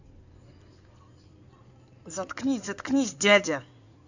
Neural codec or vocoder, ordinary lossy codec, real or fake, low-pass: codec, 16 kHz in and 24 kHz out, 2.2 kbps, FireRedTTS-2 codec; none; fake; 7.2 kHz